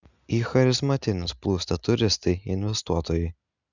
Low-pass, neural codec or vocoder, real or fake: 7.2 kHz; none; real